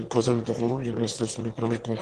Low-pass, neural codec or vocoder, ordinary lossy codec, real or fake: 9.9 kHz; autoencoder, 22.05 kHz, a latent of 192 numbers a frame, VITS, trained on one speaker; Opus, 16 kbps; fake